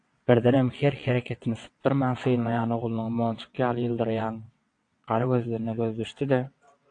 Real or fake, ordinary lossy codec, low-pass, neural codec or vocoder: fake; AAC, 48 kbps; 9.9 kHz; vocoder, 22.05 kHz, 80 mel bands, WaveNeXt